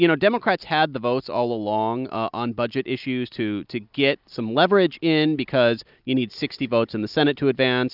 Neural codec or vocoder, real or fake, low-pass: none; real; 5.4 kHz